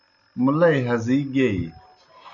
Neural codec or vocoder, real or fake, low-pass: none; real; 7.2 kHz